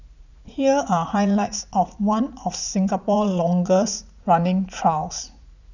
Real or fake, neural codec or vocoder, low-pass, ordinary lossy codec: fake; vocoder, 44.1 kHz, 80 mel bands, Vocos; 7.2 kHz; none